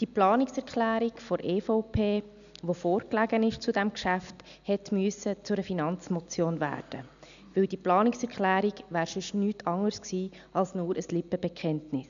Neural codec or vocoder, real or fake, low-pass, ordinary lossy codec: none; real; 7.2 kHz; none